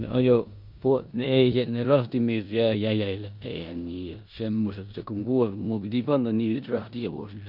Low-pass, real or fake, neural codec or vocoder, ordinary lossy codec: 5.4 kHz; fake; codec, 16 kHz in and 24 kHz out, 0.9 kbps, LongCat-Audio-Codec, four codebook decoder; none